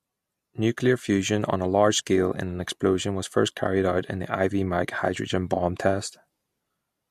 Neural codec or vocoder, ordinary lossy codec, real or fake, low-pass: none; MP3, 64 kbps; real; 14.4 kHz